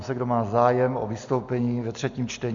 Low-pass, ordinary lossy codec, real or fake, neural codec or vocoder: 7.2 kHz; AAC, 64 kbps; real; none